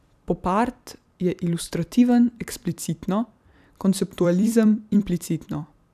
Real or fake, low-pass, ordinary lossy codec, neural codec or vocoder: fake; 14.4 kHz; none; vocoder, 44.1 kHz, 128 mel bands every 256 samples, BigVGAN v2